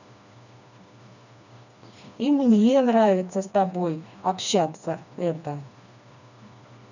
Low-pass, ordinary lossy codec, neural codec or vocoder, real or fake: 7.2 kHz; none; codec, 16 kHz, 2 kbps, FreqCodec, smaller model; fake